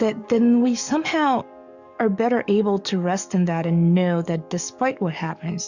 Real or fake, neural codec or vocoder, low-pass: fake; codec, 44.1 kHz, 7.8 kbps, DAC; 7.2 kHz